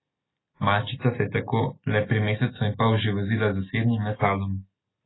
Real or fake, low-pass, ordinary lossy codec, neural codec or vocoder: real; 7.2 kHz; AAC, 16 kbps; none